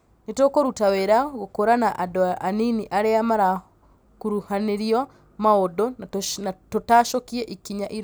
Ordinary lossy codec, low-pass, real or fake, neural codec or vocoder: none; none; real; none